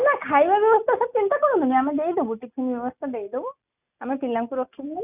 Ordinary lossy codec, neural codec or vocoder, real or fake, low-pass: none; none; real; 3.6 kHz